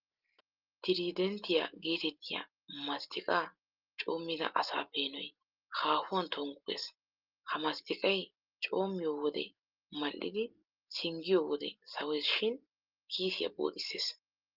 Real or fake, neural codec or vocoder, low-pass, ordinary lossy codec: real; none; 5.4 kHz; Opus, 24 kbps